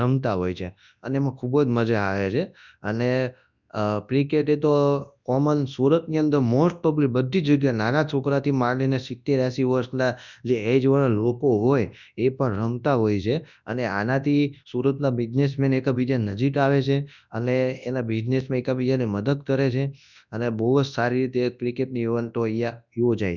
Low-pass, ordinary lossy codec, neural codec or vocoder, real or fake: 7.2 kHz; none; codec, 24 kHz, 0.9 kbps, WavTokenizer, large speech release; fake